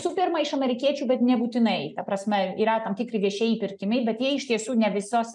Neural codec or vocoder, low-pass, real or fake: none; 10.8 kHz; real